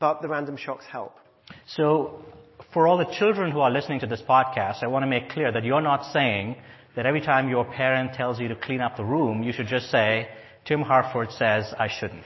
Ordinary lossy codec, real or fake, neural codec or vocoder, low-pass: MP3, 24 kbps; real; none; 7.2 kHz